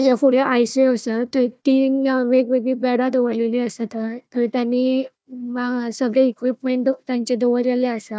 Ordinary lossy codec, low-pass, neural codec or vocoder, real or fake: none; none; codec, 16 kHz, 1 kbps, FunCodec, trained on Chinese and English, 50 frames a second; fake